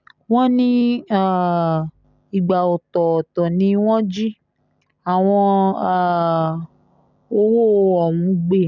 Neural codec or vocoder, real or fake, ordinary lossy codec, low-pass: none; real; none; 7.2 kHz